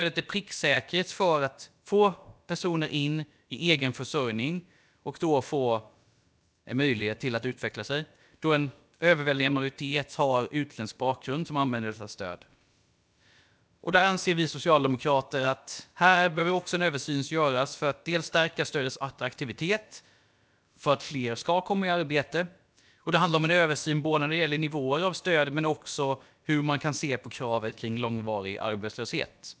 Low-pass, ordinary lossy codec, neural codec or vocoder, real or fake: none; none; codec, 16 kHz, about 1 kbps, DyCAST, with the encoder's durations; fake